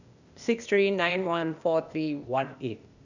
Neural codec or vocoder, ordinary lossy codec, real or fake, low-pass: codec, 16 kHz, 0.8 kbps, ZipCodec; none; fake; 7.2 kHz